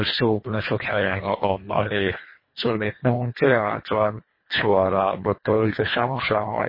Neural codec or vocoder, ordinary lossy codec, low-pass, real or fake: codec, 24 kHz, 1.5 kbps, HILCodec; MP3, 24 kbps; 5.4 kHz; fake